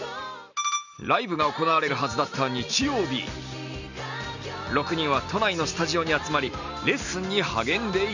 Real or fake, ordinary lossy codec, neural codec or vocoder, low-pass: real; none; none; 7.2 kHz